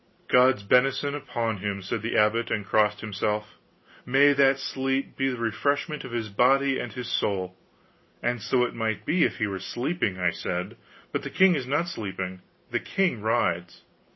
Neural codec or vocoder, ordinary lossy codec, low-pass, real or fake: none; MP3, 24 kbps; 7.2 kHz; real